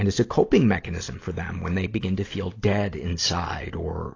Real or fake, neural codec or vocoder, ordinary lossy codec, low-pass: real; none; AAC, 32 kbps; 7.2 kHz